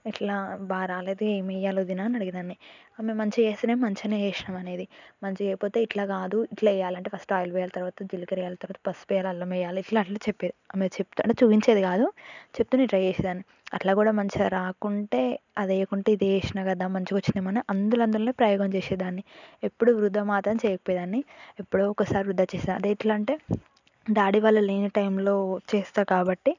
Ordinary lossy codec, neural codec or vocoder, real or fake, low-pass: none; none; real; 7.2 kHz